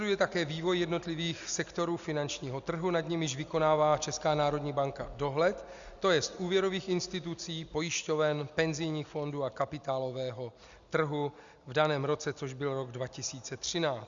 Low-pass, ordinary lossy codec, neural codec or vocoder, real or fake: 7.2 kHz; Opus, 64 kbps; none; real